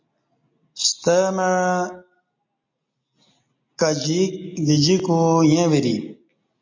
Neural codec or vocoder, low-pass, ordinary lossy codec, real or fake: none; 7.2 kHz; MP3, 48 kbps; real